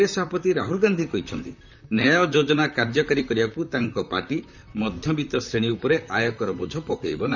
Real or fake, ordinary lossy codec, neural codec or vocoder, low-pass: fake; none; vocoder, 44.1 kHz, 128 mel bands, Pupu-Vocoder; 7.2 kHz